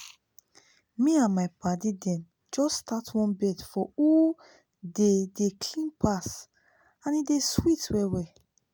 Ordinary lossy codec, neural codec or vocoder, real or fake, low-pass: none; none; real; none